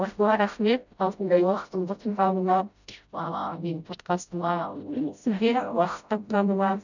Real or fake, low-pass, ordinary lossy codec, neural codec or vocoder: fake; 7.2 kHz; none; codec, 16 kHz, 0.5 kbps, FreqCodec, smaller model